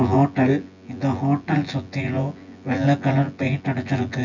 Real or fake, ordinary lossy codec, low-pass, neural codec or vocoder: fake; none; 7.2 kHz; vocoder, 24 kHz, 100 mel bands, Vocos